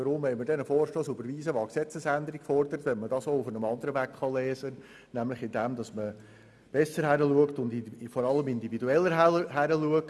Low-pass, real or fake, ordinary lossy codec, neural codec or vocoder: none; real; none; none